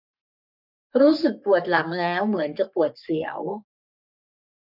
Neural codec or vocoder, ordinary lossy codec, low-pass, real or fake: codec, 44.1 kHz, 3.4 kbps, Pupu-Codec; none; 5.4 kHz; fake